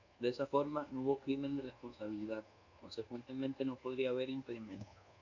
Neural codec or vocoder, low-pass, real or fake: codec, 24 kHz, 1.2 kbps, DualCodec; 7.2 kHz; fake